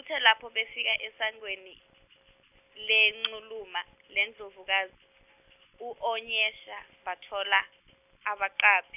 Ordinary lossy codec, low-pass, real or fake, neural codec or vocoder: none; 3.6 kHz; real; none